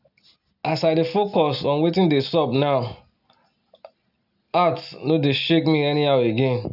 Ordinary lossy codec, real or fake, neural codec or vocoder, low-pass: none; real; none; 5.4 kHz